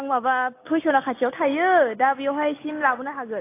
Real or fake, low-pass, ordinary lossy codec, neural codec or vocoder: real; 3.6 kHz; AAC, 24 kbps; none